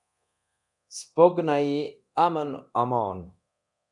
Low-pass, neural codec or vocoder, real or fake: 10.8 kHz; codec, 24 kHz, 0.9 kbps, DualCodec; fake